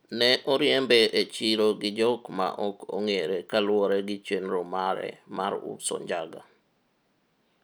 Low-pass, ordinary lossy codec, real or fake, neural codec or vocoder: none; none; real; none